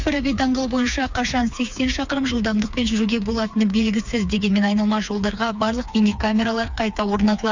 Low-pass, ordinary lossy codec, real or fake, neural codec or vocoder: 7.2 kHz; Opus, 64 kbps; fake; codec, 16 kHz, 4 kbps, FreqCodec, smaller model